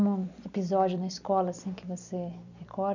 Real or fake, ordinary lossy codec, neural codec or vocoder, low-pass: fake; none; vocoder, 44.1 kHz, 128 mel bands every 256 samples, BigVGAN v2; 7.2 kHz